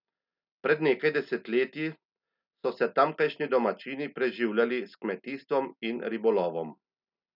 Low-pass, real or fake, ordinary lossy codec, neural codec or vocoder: 5.4 kHz; real; none; none